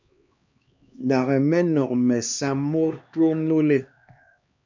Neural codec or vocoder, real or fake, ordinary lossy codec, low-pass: codec, 16 kHz, 2 kbps, X-Codec, HuBERT features, trained on LibriSpeech; fake; MP3, 64 kbps; 7.2 kHz